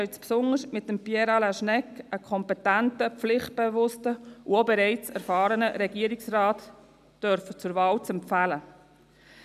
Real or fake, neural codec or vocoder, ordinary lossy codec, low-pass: real; none; none; 14.4 kHz